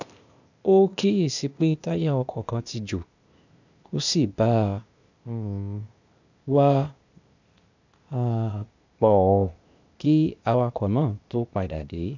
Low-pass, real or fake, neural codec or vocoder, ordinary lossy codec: 7.2 kHz; fake; codec, 16 kHz, 0.8 kbps, ZipCodec; none